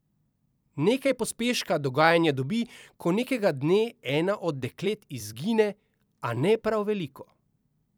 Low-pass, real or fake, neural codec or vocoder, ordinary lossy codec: none; fake; vocoder, 44.1 kHz, 128 mel bands every 256 samples, BigVGAN v2; none